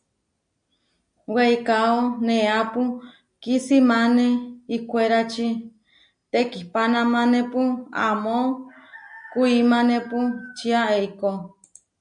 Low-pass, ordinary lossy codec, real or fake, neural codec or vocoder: 9.9 kHz; MP3, 64 kbps; real; none